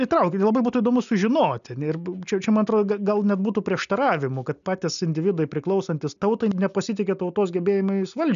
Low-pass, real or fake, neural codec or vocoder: 7.2 kHz; real; none